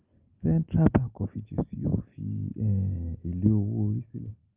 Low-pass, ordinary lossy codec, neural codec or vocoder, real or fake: 3.6 kHz; Opus, 24 kbps; none; real